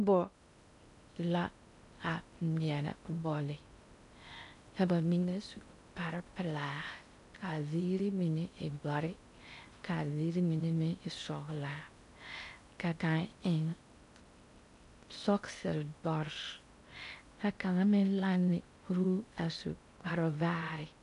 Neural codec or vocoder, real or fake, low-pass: codec, 16 kHz in and 24 kHz out, 0.6 kbps, FocalCodec, streaming, 2048 codes; fake; 10.8 kHz